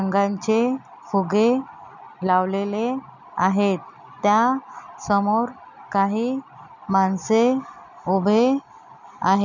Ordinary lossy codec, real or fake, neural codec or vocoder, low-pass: none; real; none; 7.2 kHz